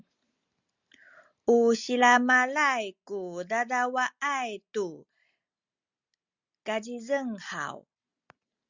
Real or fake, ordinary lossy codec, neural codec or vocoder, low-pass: real; Opus, 64 kbps; none; 7.2 kHz